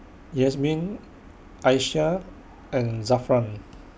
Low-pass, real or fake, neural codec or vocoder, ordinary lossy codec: none; real; none; none